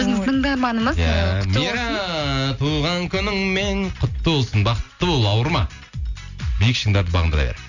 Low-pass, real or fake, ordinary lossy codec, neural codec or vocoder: 7.2 kHz; real; none; none